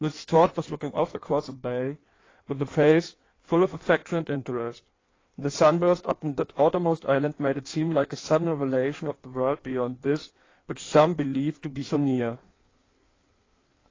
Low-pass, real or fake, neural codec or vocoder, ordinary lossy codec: 7.2 kHz; fake; codec, 16 kHz in and 24 kHz out, 1.1 kbps, FireRedTTS-2 codec; AAC, 32 kbps